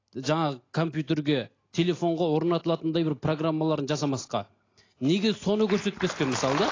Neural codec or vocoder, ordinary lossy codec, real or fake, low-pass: none; AAC, 32 kbps; real; 7.2 kHz